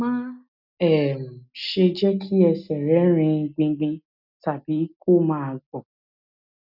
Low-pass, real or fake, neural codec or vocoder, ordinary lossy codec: 5.4 kHz; real; none; none